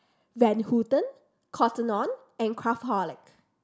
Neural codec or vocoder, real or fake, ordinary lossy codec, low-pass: none; real; none; none